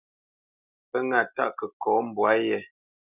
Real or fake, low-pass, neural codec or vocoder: real; 3.6 kHz; none